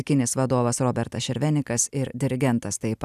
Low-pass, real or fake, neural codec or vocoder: 14.4 kHz; real; none